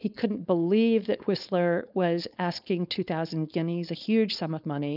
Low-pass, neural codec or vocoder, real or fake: 5.4 kHz; codec, 16 kHz, 4.8 kbps, FACodec; fake